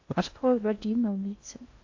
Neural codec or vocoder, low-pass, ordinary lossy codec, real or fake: codec, 16 kHz in and 24 kHz out, 0.6 kbps, FocalCodec, streaming, 4096 codes; 7.2 kHz; AAC, 48 kbps; fake